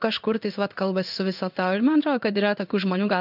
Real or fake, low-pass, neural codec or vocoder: fake; 5.4 kHz; codec, 16 kHz in and 24 kHz out, 1 kbps, XY-Tokenizer